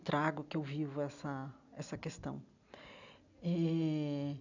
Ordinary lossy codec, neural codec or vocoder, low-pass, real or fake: none; none; 7.2 kHz; real